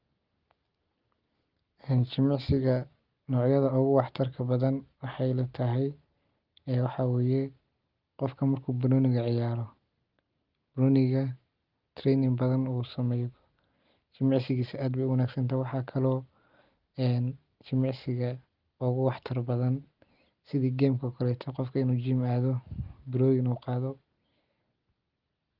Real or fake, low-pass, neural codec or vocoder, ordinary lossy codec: real; 5.4 kHz; none; Opus, 32 kbps